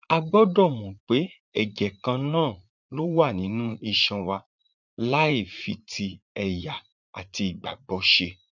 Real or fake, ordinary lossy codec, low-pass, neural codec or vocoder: fake; none; 7.2 kHz; vocoder, 44.1 kHz, 80 mel bands, Vocos